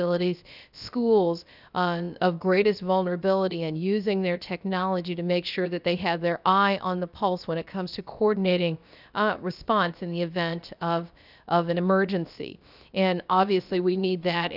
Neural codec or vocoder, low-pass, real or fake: codec, 16 kHz, about 1 kbps, DyCAST, with the encoder's durations; 5.4 kHz; fake